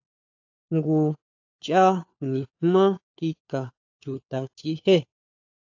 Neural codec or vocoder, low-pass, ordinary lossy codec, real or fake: codec, 16 kHz, 4 kbps, FunCodec, trained on LibriTTS, 50 frames a second; 7.2 kHz; AAC, 48 kbps; fake